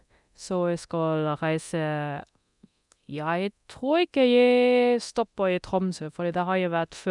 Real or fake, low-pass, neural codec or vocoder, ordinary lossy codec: fake; 10.8 kHz; codec, 24 kHz, 0.9 kbps, DualCodec; none